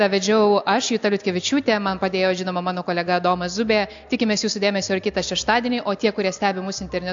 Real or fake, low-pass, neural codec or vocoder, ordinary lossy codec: real; 7.2 kHz; none; AAC, 64 kbps